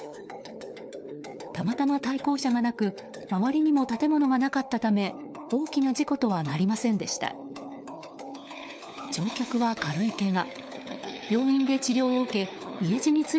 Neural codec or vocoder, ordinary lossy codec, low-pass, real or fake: codec, 16 kHz, 4 kbps, FunCodec, trained on LibriTTS, 50 frames a second; none; none; fake